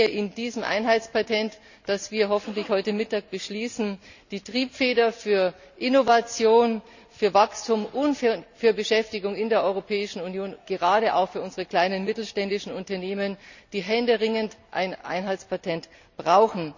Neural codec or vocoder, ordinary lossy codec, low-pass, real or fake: none; none; 7.2 kHz; real